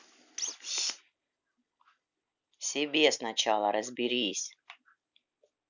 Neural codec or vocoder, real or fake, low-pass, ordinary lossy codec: none; real; 7.2 kHz; none